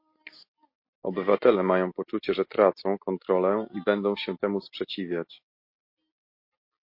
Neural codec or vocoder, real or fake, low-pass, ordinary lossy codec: none; real; 5.4 kHz; MP3, 32 kbps